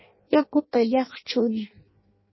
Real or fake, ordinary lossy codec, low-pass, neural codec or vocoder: fake; MP3, 24 kbps; 7.2 kHz; codec, 16 kHz in and 24 kHz out, 0.6 kbps, FireRedTTS-2 codec